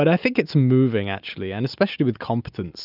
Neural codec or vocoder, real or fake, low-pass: none; real; 5.4 kHz